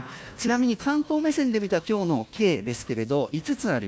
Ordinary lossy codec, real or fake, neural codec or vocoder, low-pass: none; fake; codec, 16 kHz, 1 kbps, FunCodec, trained on Chinese and English, 50 frames a second; none